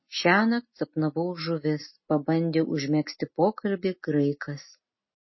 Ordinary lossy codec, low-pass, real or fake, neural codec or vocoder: MP3, 24 kbps; 7.2 kHz; real; none